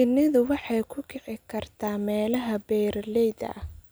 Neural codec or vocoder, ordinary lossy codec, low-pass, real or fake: none; none; none; real